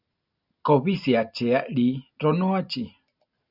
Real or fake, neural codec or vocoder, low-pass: real; none; 5.4 kHz